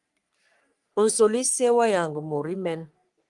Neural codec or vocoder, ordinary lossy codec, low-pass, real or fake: codec, 44.1 kHz, 3.4 kbps, Pupu-Codec; Opus, 32 kbps; 10.8 kHz; fake